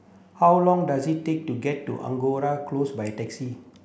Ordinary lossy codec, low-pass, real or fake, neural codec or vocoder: none; none; real; none